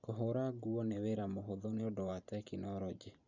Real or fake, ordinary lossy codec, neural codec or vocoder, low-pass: fake; none; vocoder, 44.1 kHz, 128 mel bands every 512 samples, BigVGAN v2; 7.2 kHz